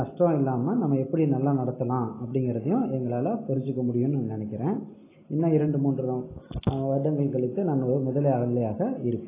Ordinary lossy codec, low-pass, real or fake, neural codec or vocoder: AAC, 16 kbps; 3.6 kHz; real; none